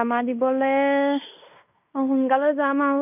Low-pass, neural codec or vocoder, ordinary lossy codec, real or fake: 3.6 kHz; codec, 16 kHz in and 24 kHz out, 0.9 kbps, LongCat-Audio-Codec, fine tuned four codebook decoder; none; fake